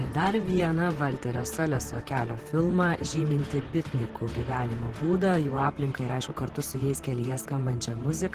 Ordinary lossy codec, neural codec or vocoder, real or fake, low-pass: Opus, 16 kbps; vocoder, 44.1 kHz, 128 mel bands, Pupu-Vocoder; fake; 14.4 kHz